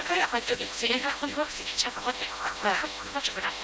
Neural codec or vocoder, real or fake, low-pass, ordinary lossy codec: codec, 16 kHz, 0.5 kbps, FreqCodec, smaller model; fake; none; none